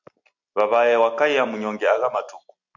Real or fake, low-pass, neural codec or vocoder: real; 7.2 kHz; none